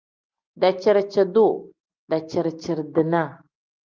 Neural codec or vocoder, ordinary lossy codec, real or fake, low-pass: none; Opus, 24 kbps; real; 7.2 kHz